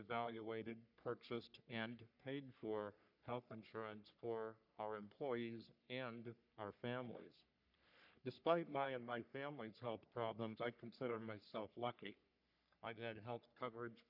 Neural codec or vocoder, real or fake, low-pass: codec, 44.1 kHz, 3.4 kbps, Pupu-Codec; fake; 5.4 kHz